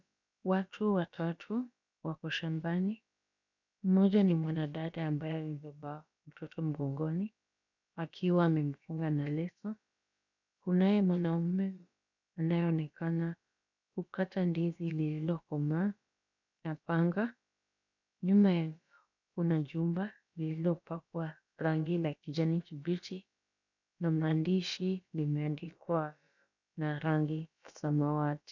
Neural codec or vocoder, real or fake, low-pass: codec, 16 kHz, about 1 kbps, DyCAST, with the encoder's durations; fake; 7.2 kHz